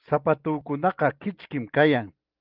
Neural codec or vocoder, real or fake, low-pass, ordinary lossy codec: none; real; 5.4 kHz; Opus, 32 kbps